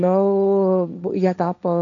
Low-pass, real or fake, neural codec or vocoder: 7.2 kHz; fake; codec, 16 kHz, 1.1 kbps, Voila-Tokenizer